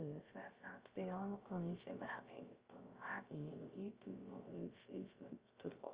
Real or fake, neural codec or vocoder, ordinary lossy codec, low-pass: fake; codec, 16 kHz, 0.3 kbps, FocalCodec; Opus, 24 kbps; 3.6 kHz